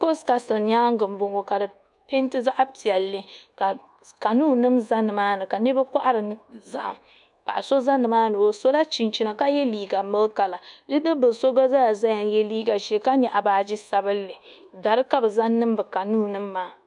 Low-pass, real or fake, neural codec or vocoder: 10.8 kHz; fake; codec, 24 kHz, 1.2 kbps, DualCodec